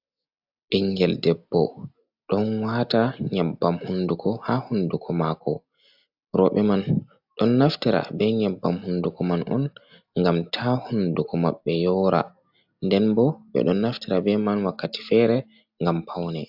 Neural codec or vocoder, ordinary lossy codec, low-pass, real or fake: none; AAC, 48 kbps; 5.4 kHz; real